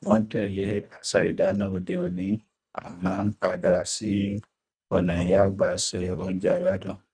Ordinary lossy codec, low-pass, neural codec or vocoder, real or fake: none; 9.9 kHz; codec, 24 kHz, 1.5 kbps, HILCodec; fake